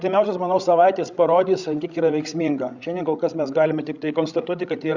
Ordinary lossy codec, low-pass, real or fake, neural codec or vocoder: Opus, 64 kbps; 7.2 kHz; fake; codec, 16 kHz, 16 kbps, FreqCodec, larger model